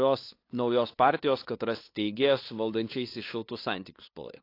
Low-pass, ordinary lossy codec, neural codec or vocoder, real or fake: 5.4 kHz; AAC, 32 kbps; codec, 16 kHz, 2 kbps, FunCodec, trained on LibriTTS, 25 frames a second; fake